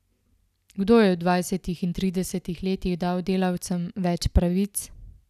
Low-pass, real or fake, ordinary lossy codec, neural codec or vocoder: 14.4 kHz; real; none; none